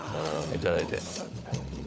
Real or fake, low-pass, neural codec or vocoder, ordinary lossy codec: fake; none; codec, 16 kHz, 16 kbps, FunCodec, trained on LibriTTS, 50 frames a second; none